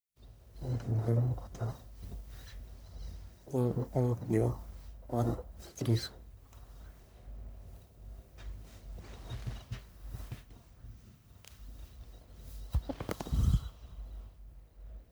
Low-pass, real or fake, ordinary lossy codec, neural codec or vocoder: none; fake; none; codec, 44.1 kHz, 1.7 kbps, Pupu-Codec